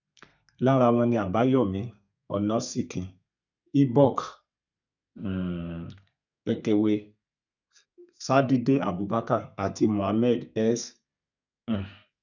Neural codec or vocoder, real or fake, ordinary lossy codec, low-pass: codec, 32 kHz, 1.9 kbps, SNAC; fake; none; 7.2 kHz